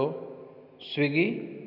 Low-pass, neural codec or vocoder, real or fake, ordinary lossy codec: 5.4 kHz; none; real; none